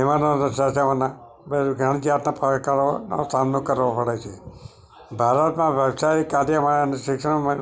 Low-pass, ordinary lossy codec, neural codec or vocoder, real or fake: none; none; none; real